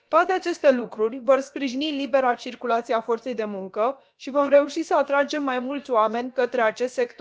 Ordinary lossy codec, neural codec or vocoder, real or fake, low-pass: none; codec, 16 kHz, about 1 kbps, DyCAST, with the encoder's durations; fake; none